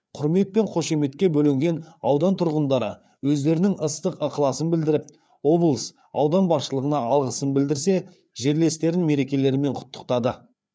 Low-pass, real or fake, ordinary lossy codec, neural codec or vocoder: none; fake; none; codec, 16 kHz, 4 kbps, FreqCodec, larger model